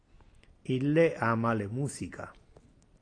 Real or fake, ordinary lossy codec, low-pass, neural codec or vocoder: real; AAC, 48 kbps; 9.9 kHz; none